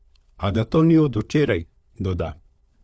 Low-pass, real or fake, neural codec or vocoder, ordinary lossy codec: none; fake; codec, 16 kHz, 4 kbps, FunCodec, trained on LibriTTS, 50 frames a second; none